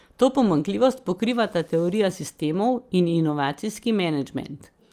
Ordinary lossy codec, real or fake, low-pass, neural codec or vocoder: Opus, 32 kbps; real; 14.4 kHz; none